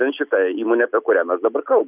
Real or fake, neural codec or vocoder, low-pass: real; none; 3.6 kHz